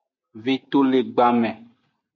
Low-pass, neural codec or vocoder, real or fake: 7.2 kHz; none; real